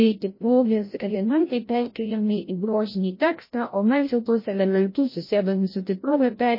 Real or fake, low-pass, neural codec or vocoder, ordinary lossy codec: fake; 5.4 kHz; codec, 16 kHz, 0.5 kbps, FreqCodec, larger model; MP3, 24 kbps